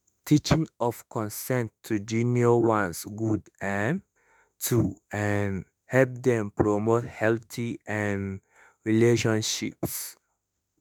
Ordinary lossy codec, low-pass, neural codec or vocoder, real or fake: none; none; autoencoder, 48 kHz, 32 numbers a frame, DAC-VAE, trained on Japanese speech; fake